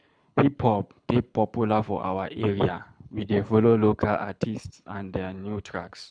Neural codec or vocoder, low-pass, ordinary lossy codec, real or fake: codec, 24 kHz, 6 kbps, HILCodec; 9.9 kHz; none; fake